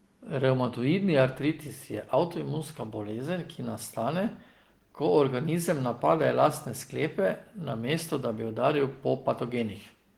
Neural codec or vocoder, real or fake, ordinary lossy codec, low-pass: none; real; Opus, 16 kbps; 19.8 kHz